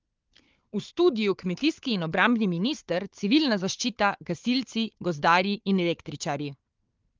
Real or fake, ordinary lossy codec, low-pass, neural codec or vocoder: fake; Opus, 32 kbps; 7.2 kHz; codec, 16 kHz, 4 kbps, FunCodec, trained on Chinese and English, 50 frames a second